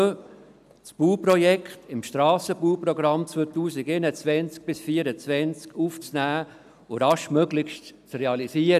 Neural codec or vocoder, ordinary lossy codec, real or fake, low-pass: none; none; real; 14.4 kHz